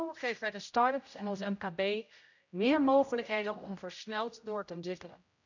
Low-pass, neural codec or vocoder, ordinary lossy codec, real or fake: 7.2 kHz; codec, 16 kHz, 0.5 kbps, X-Codec, HuBERT features, trained on general audio; none; fake